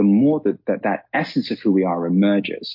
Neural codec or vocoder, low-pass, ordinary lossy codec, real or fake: none; 5.4 kHz; MP3, 32 kbps; real